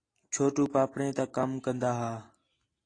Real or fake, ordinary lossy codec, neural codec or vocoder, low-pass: real; AAC, 32 kbps; none; 9.9 kHz